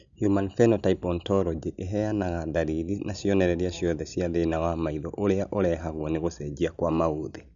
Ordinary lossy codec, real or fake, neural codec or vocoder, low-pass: none; real; none; 7.2 kHz